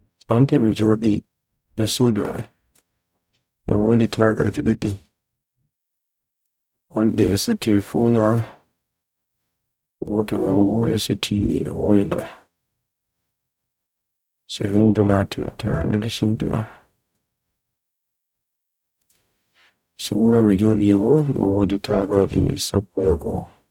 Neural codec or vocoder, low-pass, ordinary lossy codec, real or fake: codec, 44.1 kHz, 0.9 kbps, DAC; 19.8 kHz; none; fake